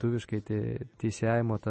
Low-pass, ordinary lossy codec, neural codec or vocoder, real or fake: 10.8 kHz; MP3, 32 kbps; none; real